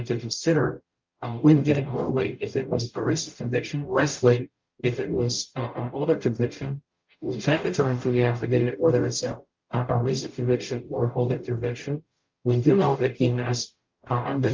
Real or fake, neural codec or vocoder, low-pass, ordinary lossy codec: fake; codec, 44.1 kHz, 0.9 kbps, DAC; 7.2 kHz; Opus, 24 kbps